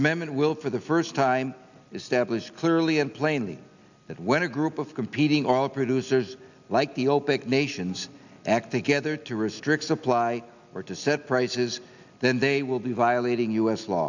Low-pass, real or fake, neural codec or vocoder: 7.2 kHz; real; none